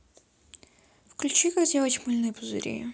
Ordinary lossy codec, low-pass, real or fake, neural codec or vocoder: none; none; real; none